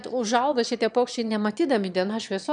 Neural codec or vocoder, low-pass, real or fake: autoencoder, 22.05 kHz, a latent of 192 numbers a frame, VITS, trained on one speaker; 9.9 kHz; fake